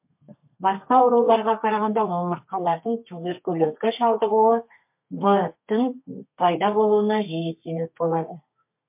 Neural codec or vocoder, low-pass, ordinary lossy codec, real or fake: codec, 32 kHz, 1.9 kbps, SNAC; 3.6 kHz; MP3, 32 kbps; fake